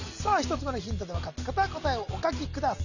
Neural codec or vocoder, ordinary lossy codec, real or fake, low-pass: none; none; real; 7.2 kHz